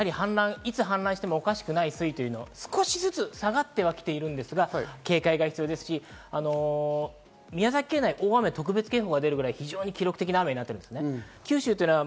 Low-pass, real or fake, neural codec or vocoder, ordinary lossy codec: none; real; none; none